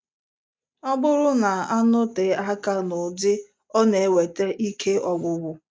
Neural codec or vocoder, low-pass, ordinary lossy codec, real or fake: none; none; none; real